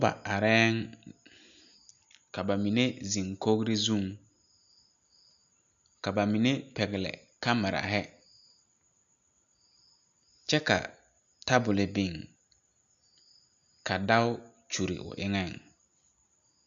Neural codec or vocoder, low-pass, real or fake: none; 7.2 kHz; real